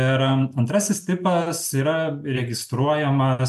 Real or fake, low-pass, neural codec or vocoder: real; 14.4 kHz; none